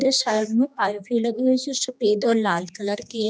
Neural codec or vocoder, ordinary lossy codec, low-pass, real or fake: codec, 16 kHz, 2 kbps, X-Codec, HuBERT features, trained on general audio; none; none; fake